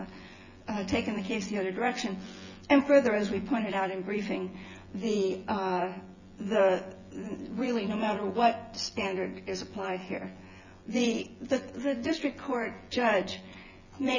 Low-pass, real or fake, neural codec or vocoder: 7.2 kHz; fake; vocoder, 24 kHz, 100 mel bands, Vocos